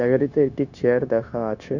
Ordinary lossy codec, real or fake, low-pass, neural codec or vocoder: none; fake; 7.2 kHz; codec, 16 kHz, 0.9 kbps, LongCat-Audio-Codec